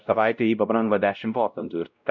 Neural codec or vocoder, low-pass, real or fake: codec, 16 kHz, 0.5 kbps, X-Codec, WavLM features, trained on Multilingual LibriSpeech; 7.2 kHz; fake